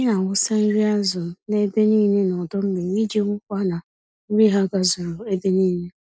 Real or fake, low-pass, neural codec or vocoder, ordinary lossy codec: real; none; none; none